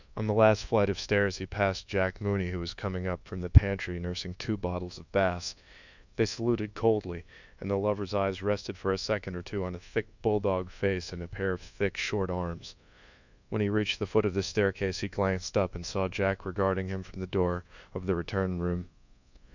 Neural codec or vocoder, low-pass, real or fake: codec, 24 kHz, 1.2 kbps, DualCodec; 7.2 kHz; fake